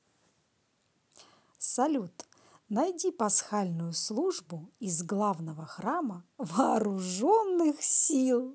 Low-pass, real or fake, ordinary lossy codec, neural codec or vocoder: none; real; none; none